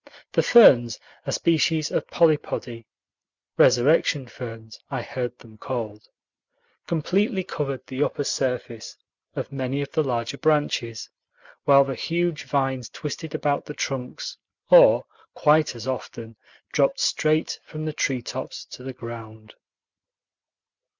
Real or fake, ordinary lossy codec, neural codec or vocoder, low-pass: real; Opus, 64 kbps; none; 7.2 kHz